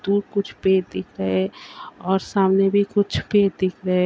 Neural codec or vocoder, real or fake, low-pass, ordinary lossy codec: none; real; none; none